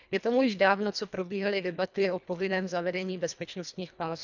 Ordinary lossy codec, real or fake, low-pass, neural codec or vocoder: none; fake; 7.2 kHz; codec, 24 kHz, 1.5 kbps, HILCodec